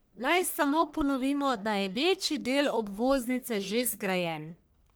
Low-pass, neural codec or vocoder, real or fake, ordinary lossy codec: none; codec, 44.1 kHz, 1.7 kbps, Pupu-Codec; fake; none